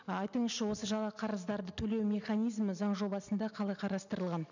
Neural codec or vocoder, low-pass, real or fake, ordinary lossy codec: none; 7.2 kHz; real; none